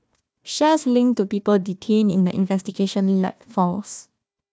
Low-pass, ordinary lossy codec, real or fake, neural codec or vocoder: none; none; fake; codec, 16 kHz, 1 kbps, FunCodec, trained on Chinese and English, 50 frames a second